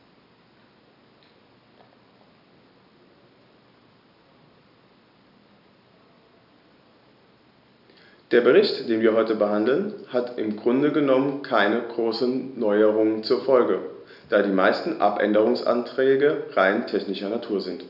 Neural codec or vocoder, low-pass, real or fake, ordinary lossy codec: none; 5.4 kHz; real; none